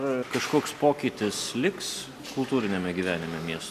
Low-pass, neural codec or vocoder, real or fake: 14.4 kHz; none; real